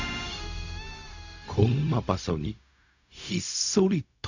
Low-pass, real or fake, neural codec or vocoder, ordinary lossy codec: 7.2 kHz; fake; codec, 16 kHz, 0.4 kbps, LongCat-Audio-Codec; none